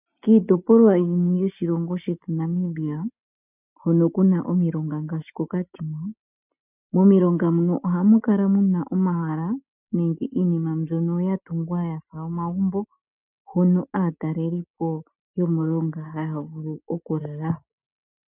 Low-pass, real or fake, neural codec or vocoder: 3.6 kHz; real; none